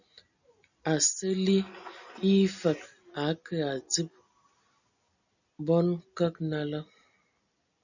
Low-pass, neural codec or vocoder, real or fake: 7.2 kHz; none; real